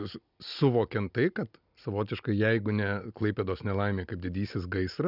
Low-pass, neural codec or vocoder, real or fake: 5.4 kHz; none; real